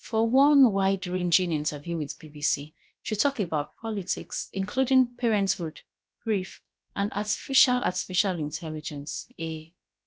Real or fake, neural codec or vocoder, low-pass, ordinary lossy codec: fake; codec, 16 kHz, about 1 kbps, DyCAST, with the encoder's durations; none; none